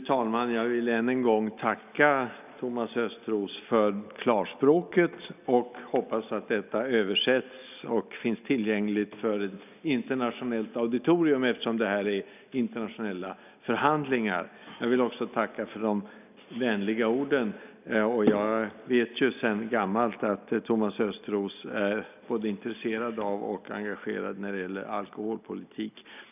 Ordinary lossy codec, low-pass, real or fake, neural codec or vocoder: none; 3.6 kHz; real; none